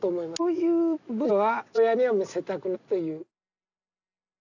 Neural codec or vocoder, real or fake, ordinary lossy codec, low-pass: none; real; none; 7.2 kHz